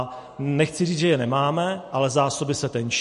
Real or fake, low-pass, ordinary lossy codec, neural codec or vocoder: real; 10.8 kHz; MP3, 48 kbps; none